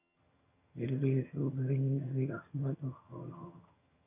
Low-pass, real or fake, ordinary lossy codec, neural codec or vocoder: 3.6 kHz; fake; AAC, 32 kbps; vocoder, 22.05 kHz, 80 mel bands, HiFi-GAN